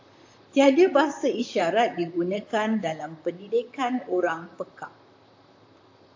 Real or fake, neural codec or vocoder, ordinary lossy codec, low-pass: fake; vocoder, 44.1 kHz, 128 mel bands, Pupu-Vocoder; AAC, 48 kbps; 7.2 kHz